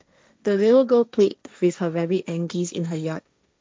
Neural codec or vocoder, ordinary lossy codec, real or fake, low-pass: codec, 16 kHz, 1.1 kbps, Voila-Tokenizer; none; fake; none